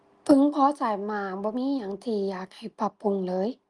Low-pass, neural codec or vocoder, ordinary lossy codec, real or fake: 10.8 kHz; none; Opus, 32 kbps; real